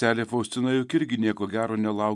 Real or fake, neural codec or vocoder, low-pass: real; none; 10.8 kHz